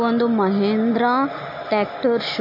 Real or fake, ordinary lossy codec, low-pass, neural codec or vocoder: real; MP3, 32 kbps; 5.4 kHz; none